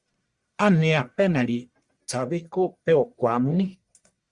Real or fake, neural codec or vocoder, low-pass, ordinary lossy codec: fake; codec, 44.1 kHz, 1.7 kbps, Pupu-Codec; 10.8 kHz; Opus, 64 kbps